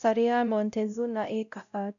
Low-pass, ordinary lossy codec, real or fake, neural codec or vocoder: 7.2 kHz; none; fake; codec, 16 kHz, 0.5 kbps, X-Codec, WavLM features, trained on Multilingual LibriSpeech